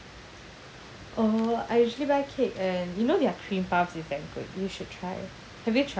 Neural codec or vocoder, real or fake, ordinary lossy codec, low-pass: none; real; none; none